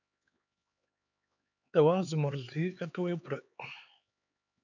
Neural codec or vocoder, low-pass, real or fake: codec, 16 kHz, 4 kbps, X-Codec, HuBERT features, trained on LibriSpeech; 7.2 kHz; fake